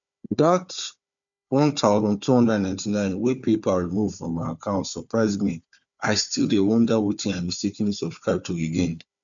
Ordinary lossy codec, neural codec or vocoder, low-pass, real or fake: none; codec, 16 kHz, 4 kbps, FunCodec, trained on Chinese and English, 50 frames a second; 7.2 kHz; fake